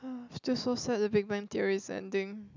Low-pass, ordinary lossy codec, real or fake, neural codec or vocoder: 7.2 kHz; none; real; none